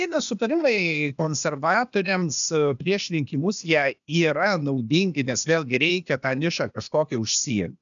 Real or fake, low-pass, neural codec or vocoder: fake; 7.2 kHz; codec, 16 kHz, 0.8 kbps, ZipCodec